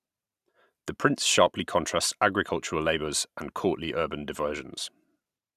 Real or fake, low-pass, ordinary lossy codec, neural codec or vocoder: fake; 14.4 kHz; none; vocoder, 44.1 kHz, 128 mel bands every 512 samples, BigVGAN v2